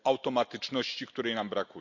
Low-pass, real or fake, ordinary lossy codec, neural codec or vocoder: 7.2 kHz; real; none; none